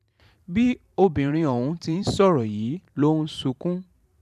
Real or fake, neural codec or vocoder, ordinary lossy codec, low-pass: fake; vocoder, 44.1 kHz, 128 mel bands every 512 samples, BigVGAN v2; none; 14.4 kHz